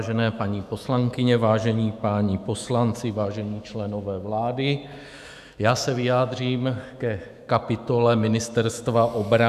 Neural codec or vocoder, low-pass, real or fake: autoencoder, 48 kHz, 128 numbers a frame, DAC-VAE, trained on Japanese speech; 14.4 kHz; fake